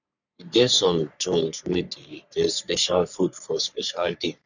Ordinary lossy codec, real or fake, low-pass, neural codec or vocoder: none; fake; 7.2 kHz; codec, 44.1 kHz, 3.4 kbps, Pupu-Codec